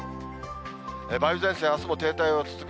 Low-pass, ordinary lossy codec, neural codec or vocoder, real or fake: none; none; none; real